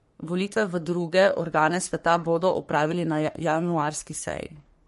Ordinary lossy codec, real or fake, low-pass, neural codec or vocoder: MP3, 48 kbps; fake; 14.4 kHz; codec, 44.1 kHz, 3.4 kbps, Pupu-Codec